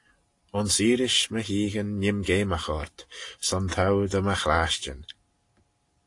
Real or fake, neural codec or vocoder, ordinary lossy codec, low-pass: real; none; AAC, 48 kbps; 10.8 kHz